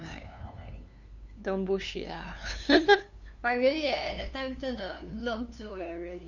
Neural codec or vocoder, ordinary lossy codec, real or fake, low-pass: codec, 16 kHz, 2 kbps, FunCodec, trained on LibriTTS, 25 frames a second; none; fake; 7.2 kHz